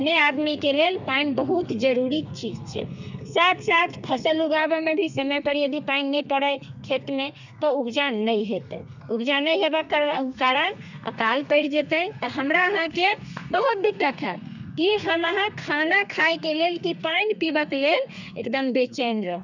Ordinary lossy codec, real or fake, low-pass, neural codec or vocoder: none; fake; 7.2 kHz; codec, 32 kHz, 1.9 kbps, SNAC